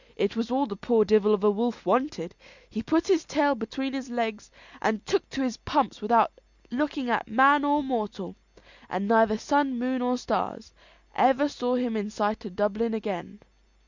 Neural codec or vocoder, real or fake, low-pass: none; real; 7.2 kHz